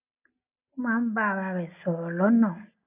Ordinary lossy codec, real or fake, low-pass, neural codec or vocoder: none; real; 3.6 kHz; none